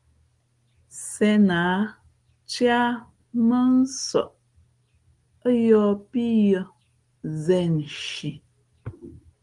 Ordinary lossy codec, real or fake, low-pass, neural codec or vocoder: Opus, 24 kbps; real; 10.8 kHz; none